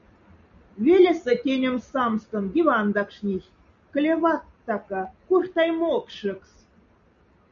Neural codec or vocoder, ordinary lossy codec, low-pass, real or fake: none; MP3, 96 kbps; 7.2 kHz; real